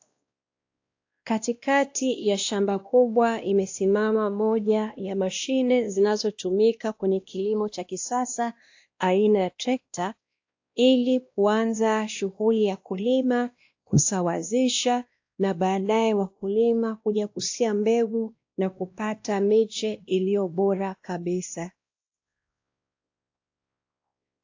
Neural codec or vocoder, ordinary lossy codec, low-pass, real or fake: codec, 16 kHz, 1 kbps, X-Codec, WavLM features, trained on Multilingual LibriSpeech; AAC, 48 kbps; 7.2 kHz; fake